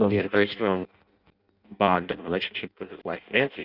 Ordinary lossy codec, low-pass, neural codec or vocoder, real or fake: Opus, 64 kbps; 5.4 kHz; codec, 16 kHz in and 24 kHz out, 0.6 kbps, FireRedTTS-2 codec; fake